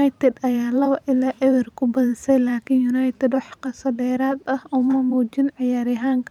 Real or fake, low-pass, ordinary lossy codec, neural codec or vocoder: fake; 19.8 kHz; none; vocoder, 44.1 kHz, 128 mel bands every 512 samples, BigVGAN v2